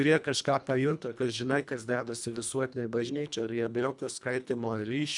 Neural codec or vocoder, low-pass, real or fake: codec, 24 kHz, 1.5 kbps, HILCodec; 10.8 kHz; fake